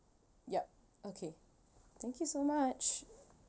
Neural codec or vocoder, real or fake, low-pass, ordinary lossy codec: none; real; none; none